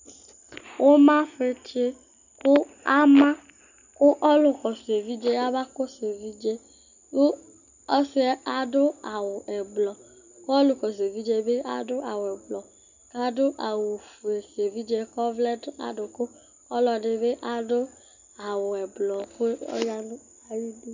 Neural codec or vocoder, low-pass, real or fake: none; 7.2 kHz; real